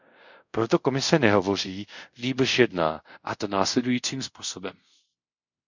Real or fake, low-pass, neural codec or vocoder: fake; 7.2 kHz; codec, 24 kHz, 0.5 kbps, DualCodec